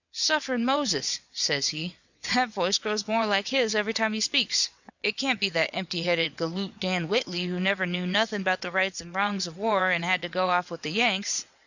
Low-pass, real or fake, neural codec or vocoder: 7.2 kHz; fake; vocoder, 22.05 kHz, 80 mel bands, WaveNeXt